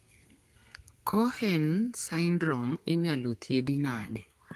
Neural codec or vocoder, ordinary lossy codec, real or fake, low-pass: codec, 32 kHz, 1.9 kbps, SNAC; Opus, 32 kbps; fake; 14.4 kHz